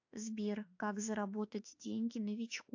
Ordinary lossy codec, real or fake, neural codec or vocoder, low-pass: AAC, 48 kbps; fake; autoencoder, 48 kHz, 32 numbers a frame, DAC-VAE, trained on Japanese speech; 7.2 kHz